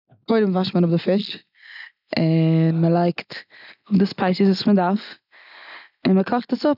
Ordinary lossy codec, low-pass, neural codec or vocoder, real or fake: none; 5.4 kHz; none; real